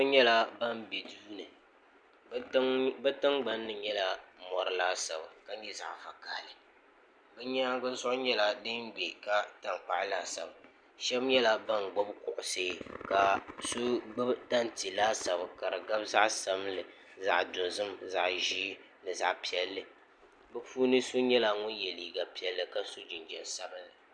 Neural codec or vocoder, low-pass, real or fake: none; 9.9 kHz; real